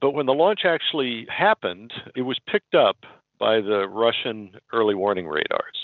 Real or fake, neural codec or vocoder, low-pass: real; none; 7.2 kHz